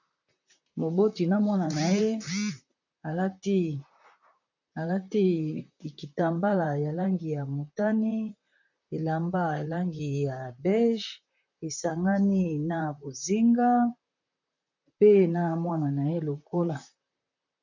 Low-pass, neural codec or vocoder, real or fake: 7.2 kHz; vocoder, 44.1 kHz, 128 mel bands, Pupu-Vocoder; fake